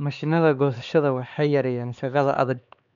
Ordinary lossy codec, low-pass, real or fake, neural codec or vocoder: none; 7.2 kHz; fake; codec, 16 kHz, 2 kbps, X-Codec, HuBERT features, trained on LibriSpeech